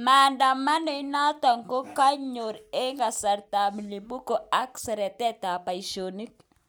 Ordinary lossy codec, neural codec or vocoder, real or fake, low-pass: none; none; real; none